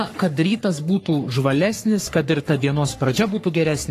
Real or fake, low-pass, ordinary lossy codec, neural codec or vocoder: fake; 14.4 kHz; AAC, 48 kbps; codec, 44.1 kHz, 3.4 kbps, Pupu-Codec